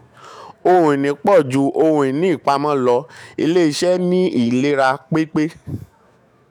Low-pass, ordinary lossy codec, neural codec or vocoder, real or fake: 19.8 kHz; none; autoencoder, 48 kHz, 128 numbers a frame, DAC-VAE, trained on Japanese speech; fake